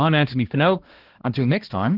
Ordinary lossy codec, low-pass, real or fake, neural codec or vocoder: Opus, 16 kbps; 5.4 kHz; fake; codec, 16 kHz, 1 kbps, X-Codec, HuBERT features, trained on balanced general audio